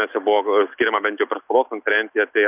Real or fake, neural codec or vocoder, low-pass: real; none; 3.6 kHz